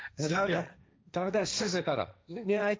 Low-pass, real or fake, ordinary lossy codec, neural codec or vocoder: none; fake; none; codec, 16 kHz, 1.1 kbps, Voila-Tokenizer